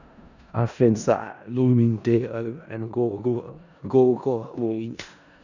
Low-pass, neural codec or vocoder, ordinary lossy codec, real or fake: 7.2 kHz; codec, 16 kHz in and 24 kHz out, 0.4 kbps, LongCat-Audio-Codec, four codebook decoder; none; fake